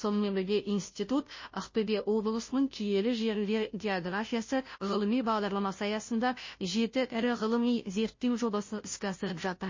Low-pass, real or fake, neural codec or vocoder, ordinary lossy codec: 7.2 kHz; fake; codec, 16 kHz, 0.5 kbps, FunCodec, trained on Chinese and English, 25 frames a second; MP3, 32 kbps